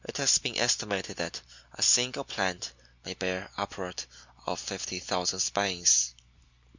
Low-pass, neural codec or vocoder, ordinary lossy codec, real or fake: 7.2 kHz; none; Opus, 64 kbps; real